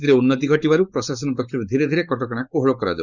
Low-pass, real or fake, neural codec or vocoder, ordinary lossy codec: 7.2 kHz; fake; codec, 16 kHz, 4.8 kbps, FACodec; none